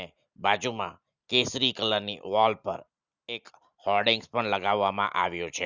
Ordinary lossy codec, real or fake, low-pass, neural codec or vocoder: Opus, 64 kbps; real; 7.2 kHz; none